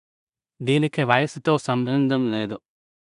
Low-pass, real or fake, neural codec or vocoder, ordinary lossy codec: 10.8 kHz; fake; codec, 16 kHz in and 24 kHz out, 0.4 kbps, LongCat-Audio-Codec, two codebook decoder; none